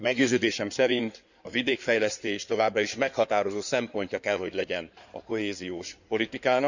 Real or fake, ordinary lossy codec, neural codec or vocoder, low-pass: fake; none; codec, 16 kHz in and 24 kHz out, 2.2 kbps, FireRedTTS-2 codec; 7.2 kHz